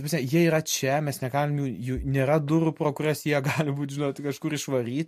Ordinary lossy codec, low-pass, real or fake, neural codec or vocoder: MP3, 64 kbps; 14.4 kHz; real; none